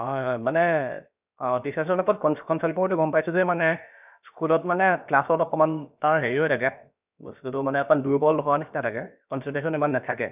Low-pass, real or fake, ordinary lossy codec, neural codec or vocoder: 3.6 kHz; fake; none; codec, 16 kHz, about 1 kbps, DyCAST, with the encoder's durations